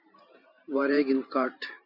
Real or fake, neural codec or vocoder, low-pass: fake; vocoder, 44.1 kHz, 128 mel bands every 512 samples, BigVGAN v2; 5.4 kHz